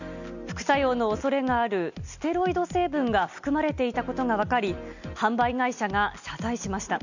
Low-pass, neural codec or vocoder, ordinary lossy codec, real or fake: 7.2 kHz; none; none; real